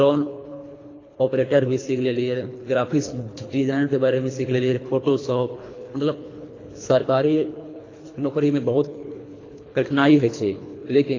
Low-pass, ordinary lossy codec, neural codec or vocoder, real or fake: 7.2 kHz; AAC, 32 kbps; codec, 24 kHz, 3 kbps, HILCodec; fake